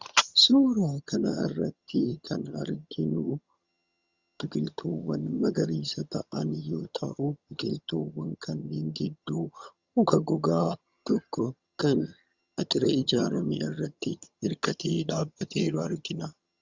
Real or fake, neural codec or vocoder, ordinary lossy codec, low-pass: fake; vocoder, 22.05 kHz, 80 mel bands, HiFi-GAN; Opus, 64 kbps; 7.2 kHz